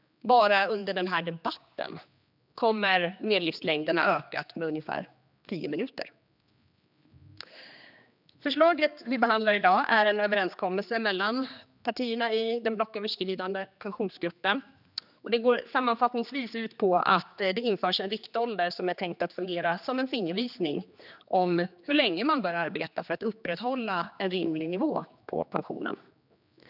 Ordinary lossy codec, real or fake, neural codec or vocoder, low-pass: none; fake; codec, 16 kHz, 2 kbps, X-Codec, HuBERT features, trained on general audio; 5.4 kHz